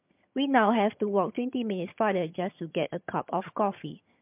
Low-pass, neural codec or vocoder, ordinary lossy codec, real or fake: 3.6 kHz; vocoder, 22.05 kHz, 80 mel bands, HiFi-GAN; MP3, 32 kbps; fake